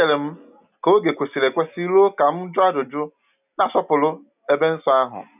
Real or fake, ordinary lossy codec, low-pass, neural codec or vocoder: real; none; 3.6 kHz; none